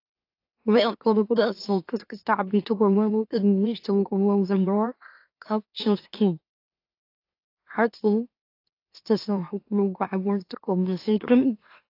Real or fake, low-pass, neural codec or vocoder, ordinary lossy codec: fake; 5.4 kHz; autoencoder, 44.1 kHz, a latent of 192 numbers a frame, MeloTTS; AAC, 32 kbps